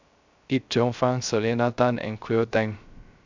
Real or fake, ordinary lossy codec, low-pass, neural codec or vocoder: fake; MP3, 64 kbps; 7.2 kHz; codec, 16 kHz, 0.3 kbps, FocalCodec